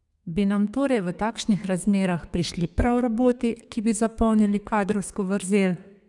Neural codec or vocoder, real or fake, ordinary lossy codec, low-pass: codec, 32 kHz, 1.9 kbps, SNAC; fake; none; 10.8 kHz